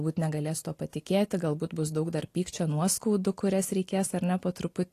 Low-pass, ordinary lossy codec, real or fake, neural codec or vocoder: 14.4 kHz; AAC, 48 kbps; real; none